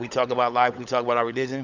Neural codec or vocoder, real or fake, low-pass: codec, 16 kHz, 16 kbps, FunCodec, trained on LibriTTS, 50 frames a second; fake; 7.2 kHz